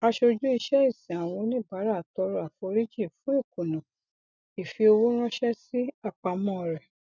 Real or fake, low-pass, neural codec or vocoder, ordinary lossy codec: real; 7.2 kHz; none; none